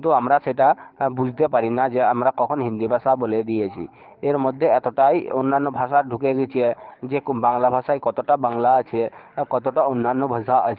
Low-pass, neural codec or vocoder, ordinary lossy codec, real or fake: 5.4 kHz; codec, 24 kHz, 6 kbps, HILCodec; Opus, 32 kbps; fake